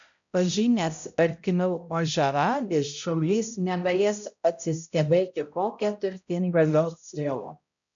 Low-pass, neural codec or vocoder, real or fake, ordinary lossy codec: 7.2 kHz; codec, 16 kHz, 0.5 kbps, X-Codec, HuBERT features, trained on balanced general audio; fake; MP3, 64 kbps